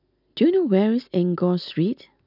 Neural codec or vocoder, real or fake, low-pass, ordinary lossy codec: none; real; 5.4 kHz; none